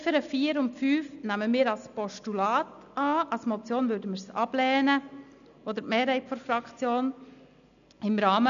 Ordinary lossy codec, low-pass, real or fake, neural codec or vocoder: none; 7.2 kHz; real; none